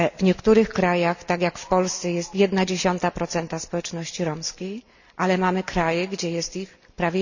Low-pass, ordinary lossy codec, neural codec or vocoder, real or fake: 7.2 kHz; none; none; real